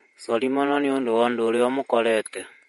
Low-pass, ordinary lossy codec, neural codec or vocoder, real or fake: 19.8 kHz; MP3, 48 kbps; vocoder, 48 kHz, 128 mel bands, Vocos; fake